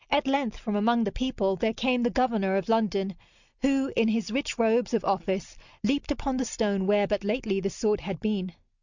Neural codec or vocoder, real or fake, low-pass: none; real; 7.2 kHz